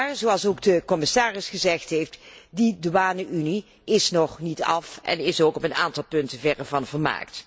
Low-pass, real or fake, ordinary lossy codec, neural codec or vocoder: none; real; none; none